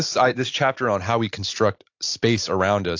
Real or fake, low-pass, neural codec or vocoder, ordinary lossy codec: real; 7.2 kHz; none; AAC, 48 kbps